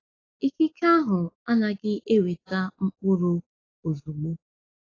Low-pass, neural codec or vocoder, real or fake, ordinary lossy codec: 7.2 kHz; none; real; AAC, 32 kbps